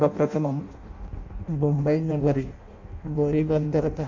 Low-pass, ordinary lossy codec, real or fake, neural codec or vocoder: 7.2 kHz; MP3, 48 kbps; fake; codec, 16 kHz in and 24 kHz out, 0.6 kbps, FireRedTTS-2 codec